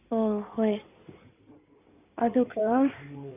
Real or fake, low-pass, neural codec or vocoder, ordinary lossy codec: fake; 3.6 kHz; codec, 16 kHz in and 24 kHz out, 2.2 kbps, FireRedTTS-2 codec; none